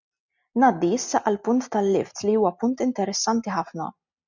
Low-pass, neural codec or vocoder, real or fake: 7.2 kHz; none; real